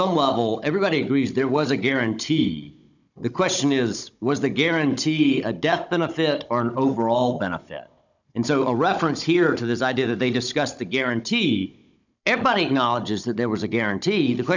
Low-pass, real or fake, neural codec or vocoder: 7.2 kHz; fake; codec, 16 kHz, 16 kbps, FunCodec, trained on Chinese and English, 50 frames a second